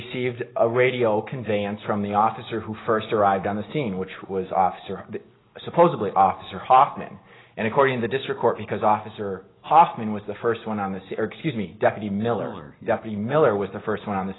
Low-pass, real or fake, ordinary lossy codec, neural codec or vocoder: 7.2 kHz; real; AAC, 16 kbps; none